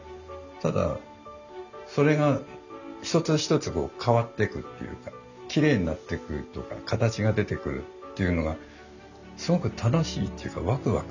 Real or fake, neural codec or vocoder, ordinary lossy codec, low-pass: real; none; none; 7.2 kHz